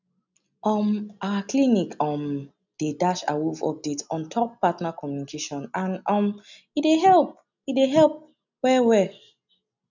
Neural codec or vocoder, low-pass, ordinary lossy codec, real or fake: none; 7.2 kHz; none; real